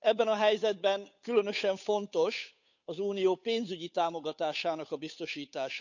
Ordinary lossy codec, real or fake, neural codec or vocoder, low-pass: none; fake; codec, 16 kHz, 8 kbps, FunCodec, trained on Chinese and English, 25 frames a second; 7.2 kHz